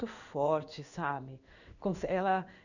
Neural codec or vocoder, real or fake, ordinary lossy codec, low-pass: codec, 16 kHz in and 24 kHz out, 1 kbps, XY-Tokenizer; fake; none; 7.2 kHz